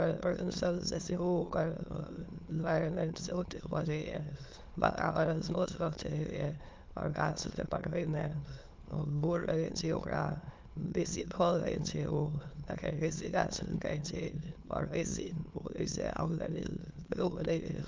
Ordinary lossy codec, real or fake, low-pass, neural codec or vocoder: Opus, 32 kbps; fake; 7.2 kHz; autoencoder, 22.05 kHz, a latent of 192 numbers a frame, VITS, trained on many speakers